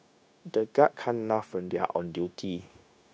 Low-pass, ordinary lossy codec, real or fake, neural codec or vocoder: none; none; fake; codec, 16 kHz, 0.9 kbps, LongCat-Audio-Codec